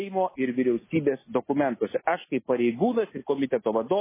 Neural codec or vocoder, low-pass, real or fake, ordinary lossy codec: none; 3.6 kHz; real; MP3, 16 kbps